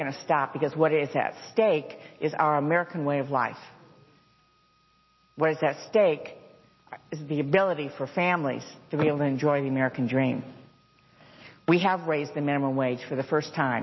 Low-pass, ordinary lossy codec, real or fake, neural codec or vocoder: 7.2 kHz; MP3, 24 kbps; real; none